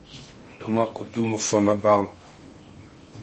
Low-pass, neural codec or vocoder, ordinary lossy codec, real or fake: 10.8 kHz; codec, 16 kHz in and 24 kHz out, 0.6 kbps, FocalCodec, streaming, 2048 codes; MP3, 32 kbps; fake